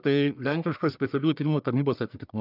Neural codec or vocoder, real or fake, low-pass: codec, 44.1 kHz, 1.7 kbps, Pupu-Codec; fake; 5.4 kHz